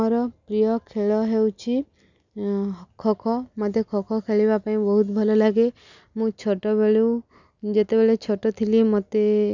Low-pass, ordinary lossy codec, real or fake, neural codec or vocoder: 7.2 kHz; none; real; none